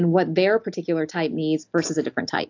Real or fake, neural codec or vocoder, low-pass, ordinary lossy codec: real; none; 7.2 kHz; AAC, 48 kbps